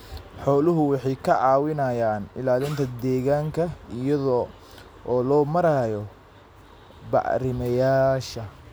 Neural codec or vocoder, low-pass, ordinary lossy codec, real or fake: none; none; none; real